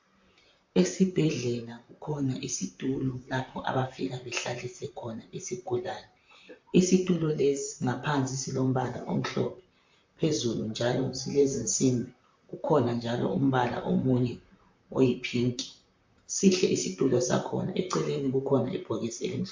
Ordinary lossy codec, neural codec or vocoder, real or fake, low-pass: MP3, 48 kbps; vocoder, 44.1 kHz, 128 mel bands, Pupu-Vocoder; fake; 7.2 kHz